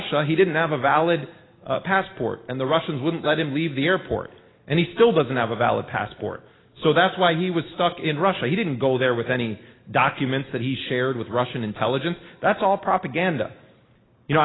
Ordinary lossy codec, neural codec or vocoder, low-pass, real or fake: AAC, 16 kbps; none; 7.2 kHz; real